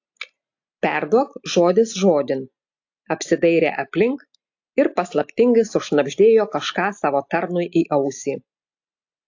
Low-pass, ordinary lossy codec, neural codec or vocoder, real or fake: 7.2 kHz; AAC, 48 kbps; none; real